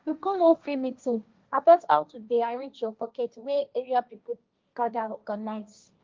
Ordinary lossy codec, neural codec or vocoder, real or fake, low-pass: Opus, 32 kbps; codec, 16 kHz, 1.1 kbps, Voila-Tokenizer; fake; 7.2 kHz